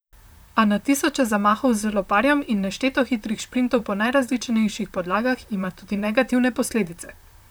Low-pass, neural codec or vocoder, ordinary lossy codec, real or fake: none; vocoder, 44.1 kHz, 128 mel bands, Pupu-Vocoder; none; fake